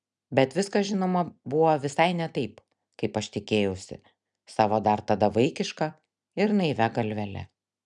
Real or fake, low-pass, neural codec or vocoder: real; 10.8 kHz; none